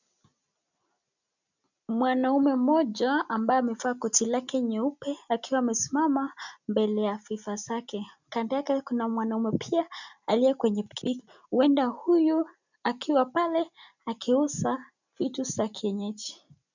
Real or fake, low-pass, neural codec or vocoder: real; 7.2 kHz; none